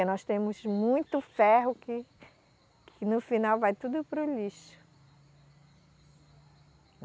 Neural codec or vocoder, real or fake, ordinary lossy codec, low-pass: none; real; none; none